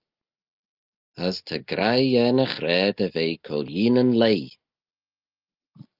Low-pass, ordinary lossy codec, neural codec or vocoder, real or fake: 5.4 kHz; Opus, 32 kbps; none; real